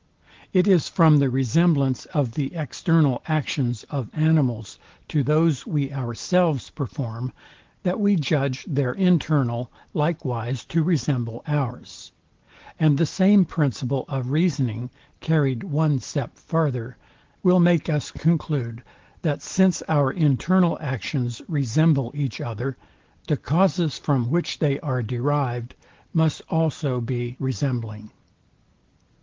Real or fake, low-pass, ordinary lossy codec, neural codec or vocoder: real; 7.2 kHz; Opus, 16 kbps; none